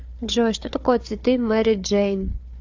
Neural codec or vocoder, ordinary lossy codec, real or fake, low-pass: codec, 16 kHz, 4 kbps, FunCodec, trained on Chinese and English, 50 frames a second; AAC, 48 kbps; fake; 7.2 kHz